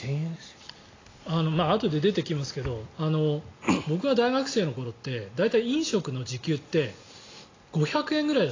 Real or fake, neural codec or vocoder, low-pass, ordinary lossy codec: real; none; 7.2 kHz; AAC, 32 kbps